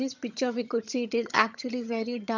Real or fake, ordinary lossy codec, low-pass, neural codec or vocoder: fake; none; 7.2 kHz; vocoder, 22.05 kHz, 80 mel bands, HiFi-GAN